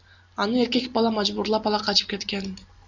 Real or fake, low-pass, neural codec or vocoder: real; 7.2 kHz; none